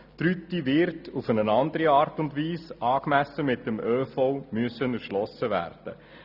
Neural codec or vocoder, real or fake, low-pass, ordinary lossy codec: none; real; 5.4 kHz; none